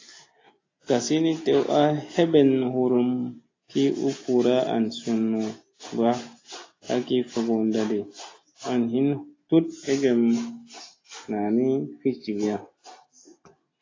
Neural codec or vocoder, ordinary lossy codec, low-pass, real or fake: none; AAC, 32 kbps; 7.2 kHz; real